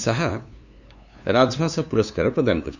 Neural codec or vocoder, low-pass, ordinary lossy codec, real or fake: codec, 16 kHz, 2 kbps, FunCodec, trained on LibriTTS, 25 frames a second; 7.2 kHz; none; fake